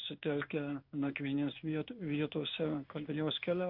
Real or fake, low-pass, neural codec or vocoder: fake; 5.4 kHz; codec, 16 kHz in and 24 kHz out, 1 kbps, XY-Tokenizer